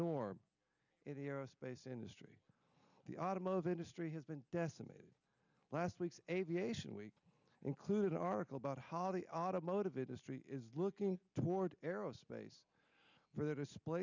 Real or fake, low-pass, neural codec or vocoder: real; 7.2 kHz; none